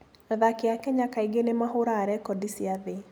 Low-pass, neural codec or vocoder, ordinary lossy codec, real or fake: none; none; none; real